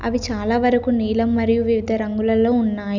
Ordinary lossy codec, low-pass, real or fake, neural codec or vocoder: none; 7.2 kHz; real; none